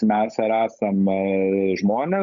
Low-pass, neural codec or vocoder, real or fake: 7.2 kHz; codec, 16 kHz, 8 kbps, FunCodec, trained on Chinese and English, 25 frames a second; fake